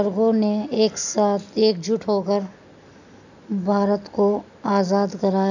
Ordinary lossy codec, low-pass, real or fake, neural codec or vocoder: none; 7.2 kHz; real; none